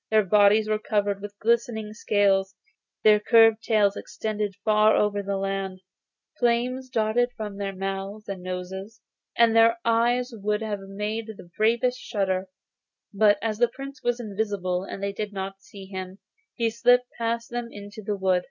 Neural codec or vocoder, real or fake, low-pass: none; real; 7.2 kHz